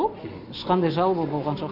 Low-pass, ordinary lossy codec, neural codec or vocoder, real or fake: 5.4 kHz; none; none; real